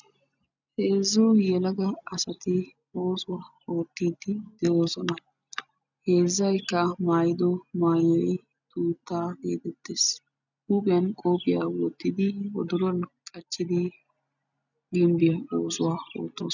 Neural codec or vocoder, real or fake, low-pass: none; real; 7.2 kHz